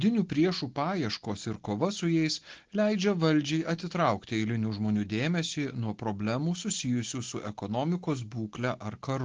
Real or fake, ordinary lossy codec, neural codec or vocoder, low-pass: real; Opus, 16 kbps; none; 7.2 kHz